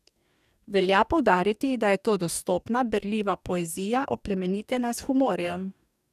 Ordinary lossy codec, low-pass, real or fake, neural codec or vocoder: none; 14.4 kHz; fake; codec, 44.1 kHz, 2.6 kbps, DAC